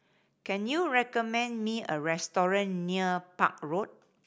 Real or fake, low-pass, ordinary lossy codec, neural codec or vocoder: real; none; none; none